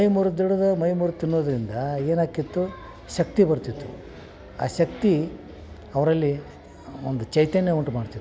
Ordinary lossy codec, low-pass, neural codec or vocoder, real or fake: none; none; none; real